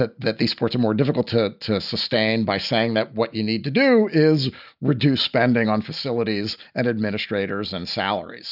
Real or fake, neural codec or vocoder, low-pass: real; none; 5.4 kHz